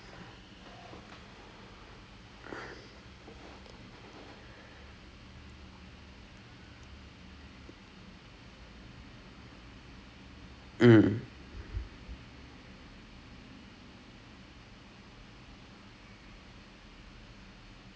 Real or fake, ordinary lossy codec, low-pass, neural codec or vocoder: real; none; none; none